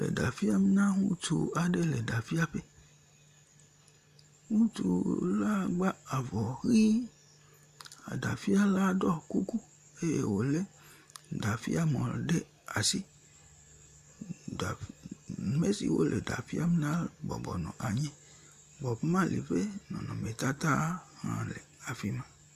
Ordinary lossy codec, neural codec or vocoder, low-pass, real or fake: MP3, 96 kbps; none; 14.4 kHz; real